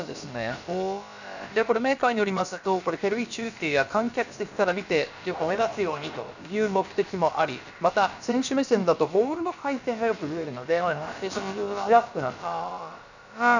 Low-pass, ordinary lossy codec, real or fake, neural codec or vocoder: 7.2 kHz; none; fake; codec, 16 kHz, about 1 kbps, DyCAST, with the encoder's durations